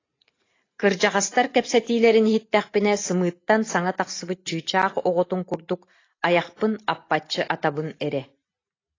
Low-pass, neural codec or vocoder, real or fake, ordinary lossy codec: 7.2 kHz; none; real; AAC, 32 kbps